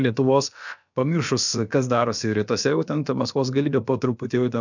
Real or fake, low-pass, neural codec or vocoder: fake; 7.2 kHz; codec, 16 kHz, about 1 kbps, DyCAST, with the encoder's durations